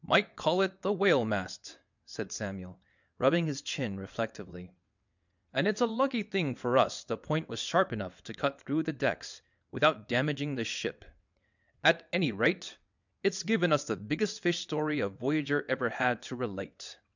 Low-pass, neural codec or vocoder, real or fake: 7.2 kHz; vocoder, 22.05 kHz, 80 mel bands, WaveNeXt; fake